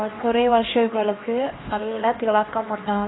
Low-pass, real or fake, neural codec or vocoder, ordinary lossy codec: 7.2 kHz; fake; codec, 16 kHz, 2 kbps, X-Codec, HuBERT features, trained on LibriSpeech; AAC, 16 kbps